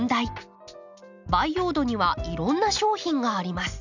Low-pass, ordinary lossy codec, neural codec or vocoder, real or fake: 7.2 kHz; none; none; real